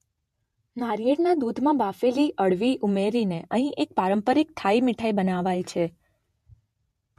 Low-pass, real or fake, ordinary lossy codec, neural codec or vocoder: 14.4 kHz; fake; MP3, 64 kbps; vocoder, 48 kHz, 128 mel bands, Vocos